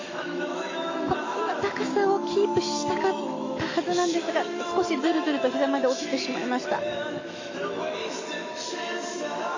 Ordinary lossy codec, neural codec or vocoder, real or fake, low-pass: AAC, 32 kbps; autoencoder, 48 kHz, 128 numbers a frame, DAC-VAE, trained on Japanese speech; fake; 7.2 kHz